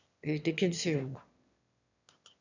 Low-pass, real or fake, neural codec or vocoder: 7.2 kHz; fake; autoencoder, 22.05 kHz, a latent of 192 numbers a frame, VITS, trained on one speaker